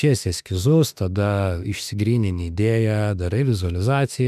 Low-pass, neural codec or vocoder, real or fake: 14.4 kHz; autoencoder, 48 kHz, 32 numbers a frame, DAC-VAE, trained on Japanese speech; fake